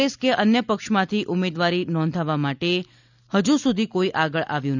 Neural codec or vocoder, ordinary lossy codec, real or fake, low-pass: none; none; real; 7.2 kHz